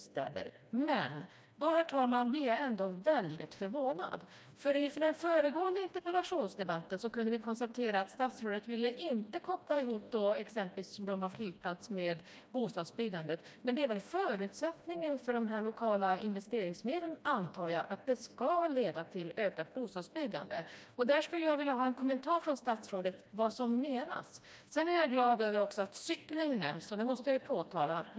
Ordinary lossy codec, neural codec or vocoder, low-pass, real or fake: none; codec, 16 kHz, 1 kbps, FreqCodec, smaller model; none; fake